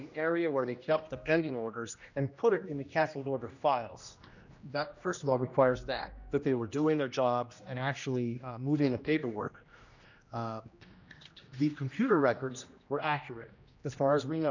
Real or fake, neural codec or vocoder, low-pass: fake; codec, 16 kHz, 1 kbps, X-Codec, HuBERT features, trained on general audio; 7.2 kHz